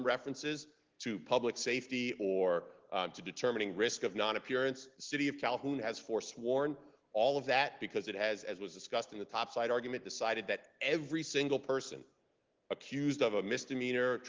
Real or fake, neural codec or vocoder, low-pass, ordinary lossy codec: real; none; 7.2 kHz; Opus, 16 kbps